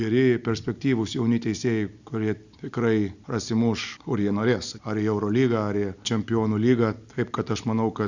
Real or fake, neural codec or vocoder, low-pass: real; none; 7.2 kHz